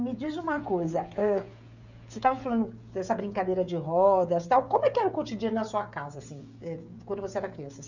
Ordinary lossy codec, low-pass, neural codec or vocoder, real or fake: none; 7.2 kHz; codec, 16 kHz, 16 kbps, FreqCodec, smaller model; fake